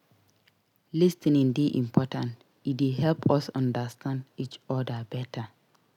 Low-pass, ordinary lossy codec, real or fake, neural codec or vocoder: 19.8 kHz; none; real; none